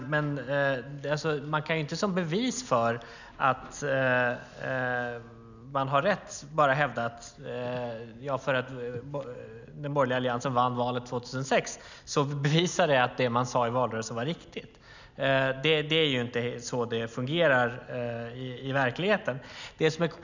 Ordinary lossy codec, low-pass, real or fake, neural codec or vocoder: none; 7.2 kHz; real; none